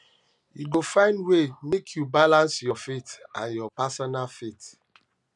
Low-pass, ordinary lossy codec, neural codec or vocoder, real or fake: 10.8 kHz; none; none; real